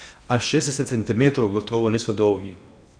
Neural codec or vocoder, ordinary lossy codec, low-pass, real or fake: codec, 16 kHz in and 24 kHz out, 0.8 kbps, FocalCodec, streaming, 65536 codes; none; 9.9 kHz; fake